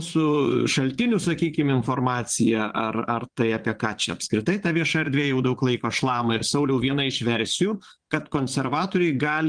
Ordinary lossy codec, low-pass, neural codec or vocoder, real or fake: Opus, 24 kbps; 9.9 kHz; vocoder, 22.05 kHz, 80 mel bands, Vocos; fake